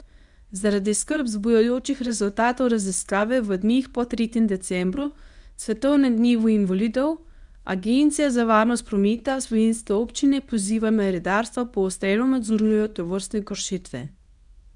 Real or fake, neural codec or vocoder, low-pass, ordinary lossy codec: fake; codec, 24 kHz, 0.9 kbps, WavTokenizer, medium speech release version 1; 10.8 kHz; none